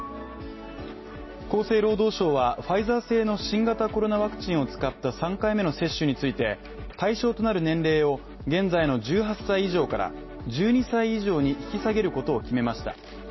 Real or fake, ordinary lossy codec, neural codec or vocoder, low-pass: real; MP3, 24 kbps; none; 7.2 kHz